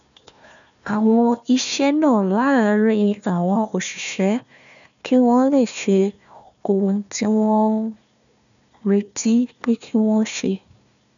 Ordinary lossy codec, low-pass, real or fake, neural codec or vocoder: none; 7.2 kHz; fake; codec, 16 kHz, 1 kbps, FunCodec, trained on Chinese and English, 50 frames a second